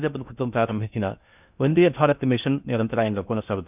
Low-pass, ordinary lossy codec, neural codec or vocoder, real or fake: 3.6 kHz; none; codec, 16 kHz in and 24 kHz out, 0.6 kbps, FocalCodec, streaming, 4096 codes; fake